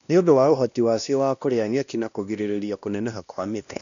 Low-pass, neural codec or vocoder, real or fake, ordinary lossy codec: 7.2 kHz; codec, 16 kHz, 1 kbps, X-Codec, WavLM features, trained on Multilingual LibriSpeech; fake; none